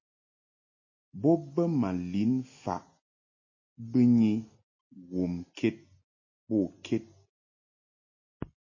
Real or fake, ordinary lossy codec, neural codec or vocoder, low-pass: real; MP3, 32 kbps; none; 7.2 kHz